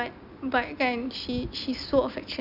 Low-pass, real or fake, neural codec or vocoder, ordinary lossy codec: 5.4 kHz; real; none; none